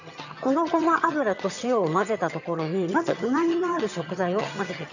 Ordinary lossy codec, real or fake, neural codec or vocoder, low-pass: none; fake; vocoder, 22.05 kHz, 80 mel bands, HiFi-GAN; 7.2 kHz